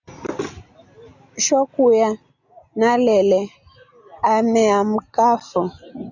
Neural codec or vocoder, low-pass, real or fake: none; 7.2 kHz; real